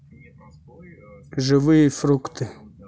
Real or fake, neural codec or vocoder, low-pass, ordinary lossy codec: real; none; none; none